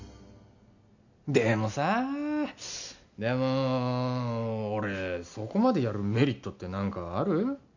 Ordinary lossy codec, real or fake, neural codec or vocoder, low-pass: none; real; none; 7.2 kHz